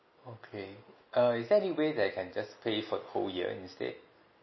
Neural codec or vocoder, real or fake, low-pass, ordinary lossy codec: none; real; 7.2 kHz; MP3, 24 kbps